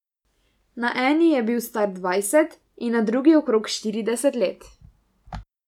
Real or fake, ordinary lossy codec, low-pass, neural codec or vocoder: real; none; 19.8 kHz; none